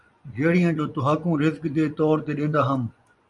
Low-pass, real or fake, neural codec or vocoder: 10.8 kHz; fake; vocoder, 24 kHz, 100 mel bands, Vocos